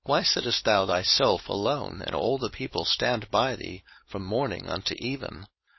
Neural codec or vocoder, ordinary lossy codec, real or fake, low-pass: codec, 16 kHz, 4.8 kbps, FACodec; MP3, 24 kbps; fake; 7.2 kHz